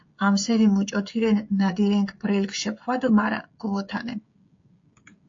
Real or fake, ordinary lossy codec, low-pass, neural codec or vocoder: fake; AAC, 48 kbps; 7.2 kHz; codec, 16 kHz, 16 kbps, FreqCodec, smaller model